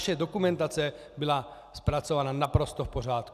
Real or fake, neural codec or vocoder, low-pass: real; none; 14.4 kHz